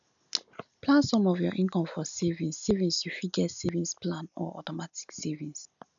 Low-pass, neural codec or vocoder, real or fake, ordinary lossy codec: 7.2 kHz; none; real; none